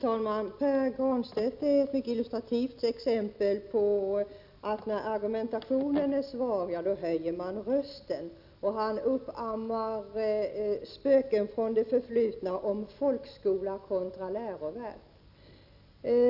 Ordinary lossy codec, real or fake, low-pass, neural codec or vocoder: none; real; 5.4 kHz; none